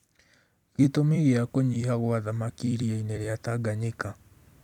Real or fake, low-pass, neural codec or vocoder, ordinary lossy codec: fake; 19.8 kHz; vocoder, 44.1 kHz, 128 mel bands every 256 samples, BigVGAN v2; none